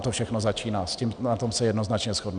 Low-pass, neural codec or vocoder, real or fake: 9.9 kHz; none; real